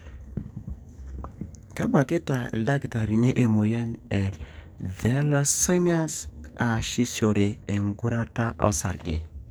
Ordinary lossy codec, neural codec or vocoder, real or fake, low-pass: none; codec, 44.1 kHz, 2.6 kbps, SNAC; fake; none